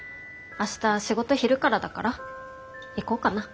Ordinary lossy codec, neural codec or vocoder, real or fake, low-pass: none; none; real; none